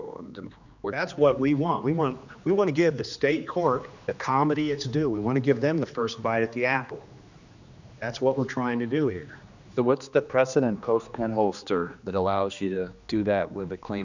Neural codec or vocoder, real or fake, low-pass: codec, 16 kHz, 2 kbps, X-Codec, HuBERT features, trained on general audio; fake; 7.2 kHz